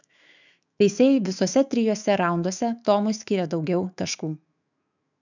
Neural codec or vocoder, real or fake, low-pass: autoencoder, 48 kHz, 128 numbers a frame, DAC-VAE, trained on Japanese speech; fake; 7.2 kHz